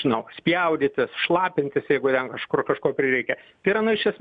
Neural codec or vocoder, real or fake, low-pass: none; real; 9.9 kHz